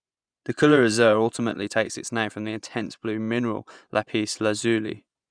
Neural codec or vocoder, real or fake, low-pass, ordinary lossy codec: vocoder, 24 kHz, 100 mel bands, Vocos; fake; 9.9 kHz; none